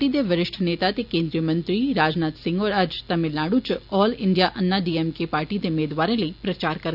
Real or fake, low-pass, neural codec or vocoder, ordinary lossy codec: real; 5.4 kHz; none; none